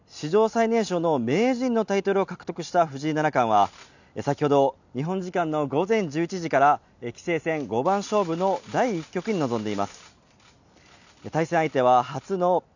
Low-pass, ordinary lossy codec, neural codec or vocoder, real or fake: 7.2 kHz; none; none; real